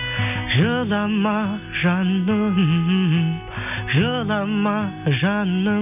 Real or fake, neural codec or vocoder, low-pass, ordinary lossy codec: real; none; 3.6 kHz; none